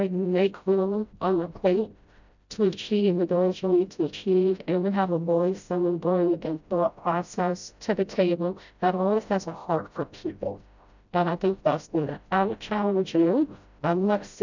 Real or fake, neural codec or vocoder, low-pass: fake; codec, 16 kHz, 0.5 kbps, FreqCodec, smaller model; 7.2 kHz